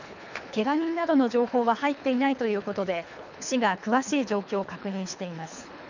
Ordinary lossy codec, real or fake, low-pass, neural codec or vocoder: none; fake; 7.2 kHz; codec, 24 kHz, 3 kbps, HILCodec